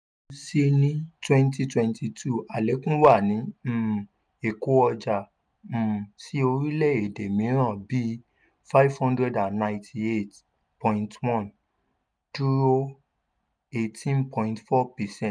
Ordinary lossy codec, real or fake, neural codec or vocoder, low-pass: none; real; none; 9.9 kHz